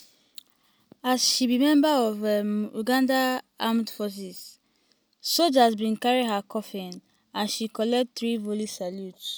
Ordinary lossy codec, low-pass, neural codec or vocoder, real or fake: none; none; none; real